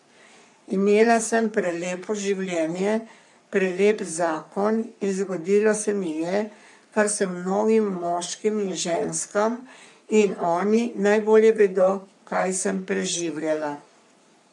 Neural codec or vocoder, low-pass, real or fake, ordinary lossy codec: codec, 44.1 kHz, 3.4 kbps, Pupu-Codec; 10.8 kHz; fake; MP3, 64 kbps